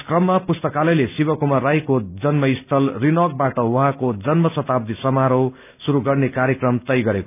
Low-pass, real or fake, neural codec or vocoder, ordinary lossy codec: 3.6 kHz; real; none; none